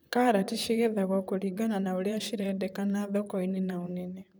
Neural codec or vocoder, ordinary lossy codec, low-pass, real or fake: vocoder, 44.1 kHz, 128 mel bands, Pupu-Vocoder; none; none; fake